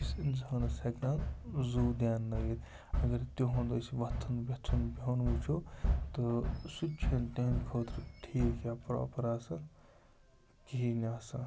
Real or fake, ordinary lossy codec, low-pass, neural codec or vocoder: real; none; none; none